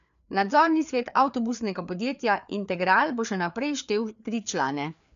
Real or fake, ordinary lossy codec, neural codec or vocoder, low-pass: fake; none; codec, 16 kHz, 4 kbps, FreqCodec, larger model; 7.2 kHz